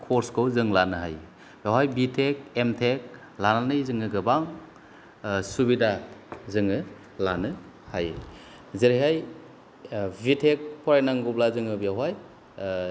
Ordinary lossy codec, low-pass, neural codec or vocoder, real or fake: none; none; none; real